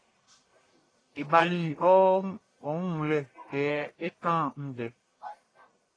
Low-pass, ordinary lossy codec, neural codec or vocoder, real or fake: 9.9 kHz; AAC, 32 kbps; codec, 44.1 kHz, 1.7 kbps, Pupu-Codec; fake